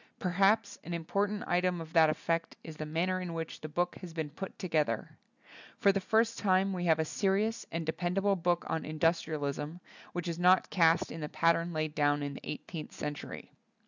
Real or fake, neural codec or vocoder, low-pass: real; none; 7.2 kHz